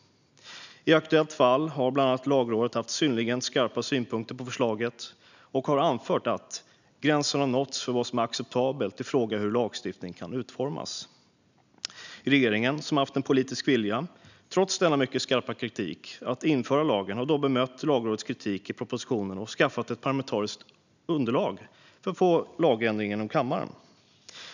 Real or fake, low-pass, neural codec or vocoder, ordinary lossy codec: real; 7.2 kHz; none; none